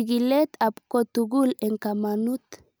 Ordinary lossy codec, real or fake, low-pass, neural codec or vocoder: none; real; none; none